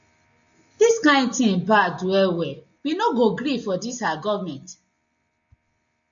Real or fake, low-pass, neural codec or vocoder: real; 7.2 kHz; none